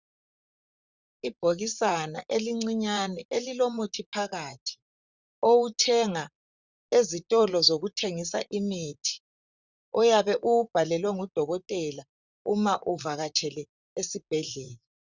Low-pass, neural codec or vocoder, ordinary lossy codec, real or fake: 7.2 kHz; vocoder, 44.1 kHz, 128 mel bands, Pupu-Vocoder; Opus, 64 kbps; fake